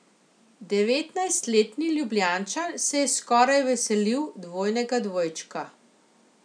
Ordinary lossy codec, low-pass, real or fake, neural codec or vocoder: none; 9.9 kHz; real; none